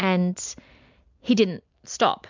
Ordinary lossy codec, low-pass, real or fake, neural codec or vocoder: MP3, 64 kbps; 7.2 kHz; real; none